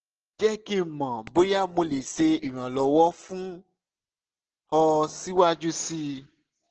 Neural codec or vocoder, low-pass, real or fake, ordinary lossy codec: none; 7.2 kHz; real; Opus, 16 kbps